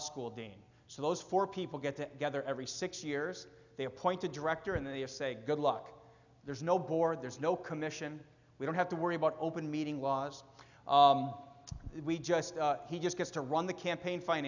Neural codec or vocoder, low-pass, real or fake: none; 7.2 kHz; real